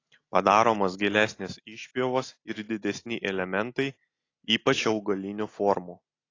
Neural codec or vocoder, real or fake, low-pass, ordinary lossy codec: none; real; 7.2 kHz; AAC, 32 kbps